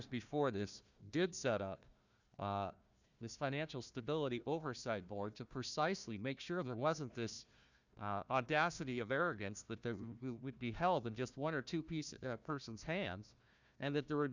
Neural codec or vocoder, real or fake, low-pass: codec, 16 kHz, 1 kbps, FunCodec, trained on Chinese and English, 50 frames a second; fake; 7.2 kHz